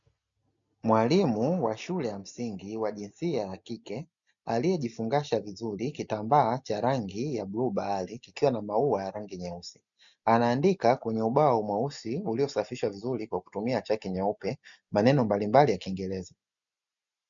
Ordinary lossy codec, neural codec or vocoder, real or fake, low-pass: MP3, 96 kbps; none; real; 7.2 kHz